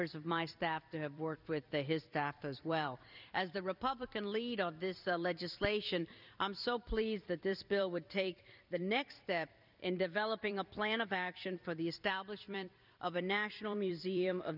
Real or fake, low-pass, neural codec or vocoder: fake; 5.4 kHz; vocoder, 44.1 kHz, 128 mel bands every 256 samples, BigVGAN v2